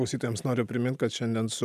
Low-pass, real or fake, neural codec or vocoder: 14.4 kHz; real; none